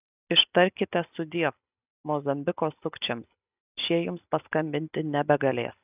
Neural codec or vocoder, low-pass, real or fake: none; 3.6 kHz; real